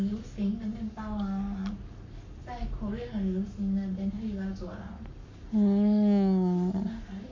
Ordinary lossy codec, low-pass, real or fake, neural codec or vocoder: MP3, 48 kbps; 7.2 kHz; fake; codec, 44.1 kHz, 7.8 kbps, Pupu-Codec